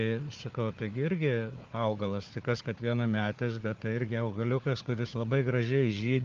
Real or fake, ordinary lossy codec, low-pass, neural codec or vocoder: fake; Opus, 32 kbps; 7.2 kHz; codec, 16 kHz, 4 kbps, FunCodec, trained on Chinese and English, 50 frames a second